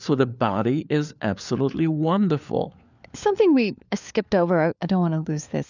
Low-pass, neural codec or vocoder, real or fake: 7.2 kHz; codec, 16 kHz, 4 kbps, FunCodec, trained on LibriTTS, 50 frames a second; fake